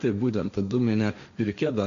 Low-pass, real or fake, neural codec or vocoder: 7.2 kHz; fake; codec, 16 kHz, 1.1 kbps, Voila-Tokenizer